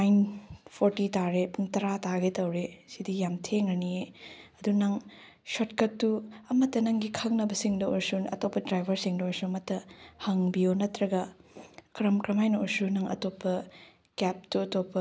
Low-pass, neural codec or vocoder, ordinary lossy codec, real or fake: none; none; none; real